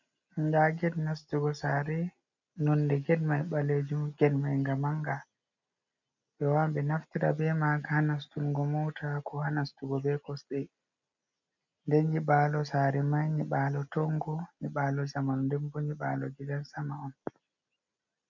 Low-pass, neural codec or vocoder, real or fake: 7.2 kHz; none; real